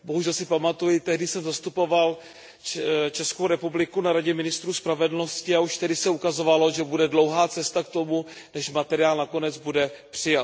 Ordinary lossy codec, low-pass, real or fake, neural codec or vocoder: none; none; real; none